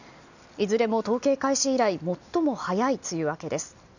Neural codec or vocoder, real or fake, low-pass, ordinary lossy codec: none; real; 7.2 kHz; none